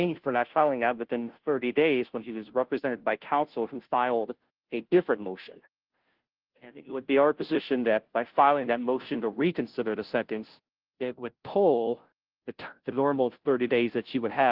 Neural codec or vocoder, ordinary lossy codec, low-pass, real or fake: codec, 16 kHz, 0.5 kbps, FunCodec, trained on Chinese and English, 25 frames a second; Opus, 16 kbps; 5.4 kHz; fake